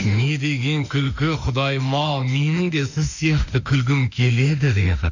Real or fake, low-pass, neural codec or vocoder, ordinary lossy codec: fake; 7.2 kHz; autoencoder, 48 kHz, 32 numbers a frame, DAC-VAE, trained on Japanese speech; none